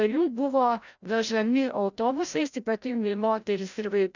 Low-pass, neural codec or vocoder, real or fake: 7.2 kHz; codec, 16 kHz, 0.5 kbps, FreqCodec, larger model; fake